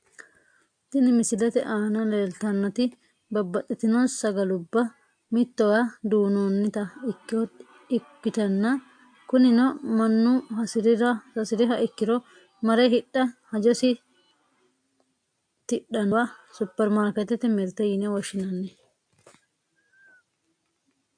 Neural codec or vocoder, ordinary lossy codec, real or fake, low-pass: none; AAC, 64 kbps; real; 9.9 kHz